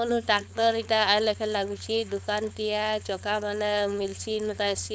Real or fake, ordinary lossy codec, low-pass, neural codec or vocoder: fake; none; none; codec, 16 kHz, 4.8 kbps, FACodec